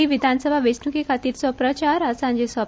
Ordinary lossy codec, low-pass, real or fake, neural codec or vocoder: none; none; real; none